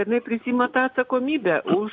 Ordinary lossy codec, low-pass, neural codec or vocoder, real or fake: AAC, 48 kbps; 7.2 kHz; none; real